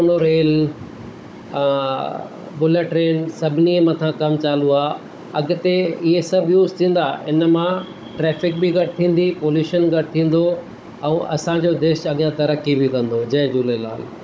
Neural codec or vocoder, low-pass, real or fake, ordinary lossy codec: codec, 16 kHz, 16 kbps, FunCodec, trained on Chinese and English, 50 frames a second; none; fake; none